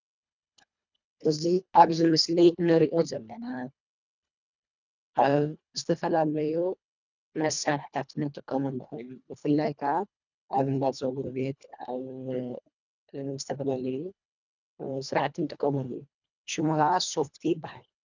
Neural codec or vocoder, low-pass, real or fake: codec, 24 kHz, 1.5 kbps, HILCodec; 7.2 kHz; fake